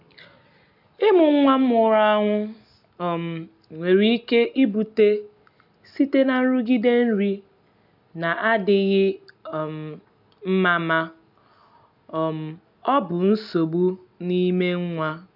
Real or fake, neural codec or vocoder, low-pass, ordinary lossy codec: real; none; 5.4 kHz; none